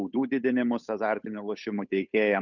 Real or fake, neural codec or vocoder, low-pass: fake; codec, 16 kHz, 8 kbps, FunCodec, trained on Chinese and English, 25 frames a second; 7.2 kHz